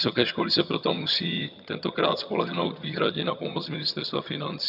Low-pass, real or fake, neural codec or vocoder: 5.4 kHz; fake; vocoder, 22.05 kHz, 80 mel bands, HiFi-GAN